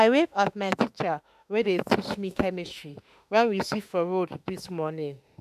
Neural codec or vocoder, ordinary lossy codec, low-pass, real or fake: autoencoder, 48 kHz, 32 numbers a frame, DAC-VAE, trained on Japanese speech; none; 14.4 kHz; fake